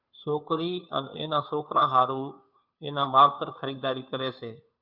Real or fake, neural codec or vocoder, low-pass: fake; codec, 16 kHz, 2 kbps, FunCodec, trained on Chinese and English, 25 frames a second; 5.4 kHz